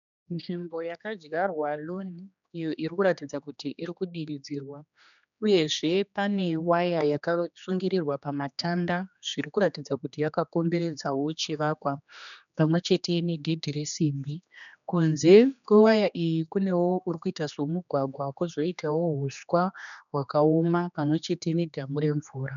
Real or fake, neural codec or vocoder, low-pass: fake; codec, 16 kHz, 2 kbps, X-Codec, HuBERT features, trained on general audio; 7.2 kHz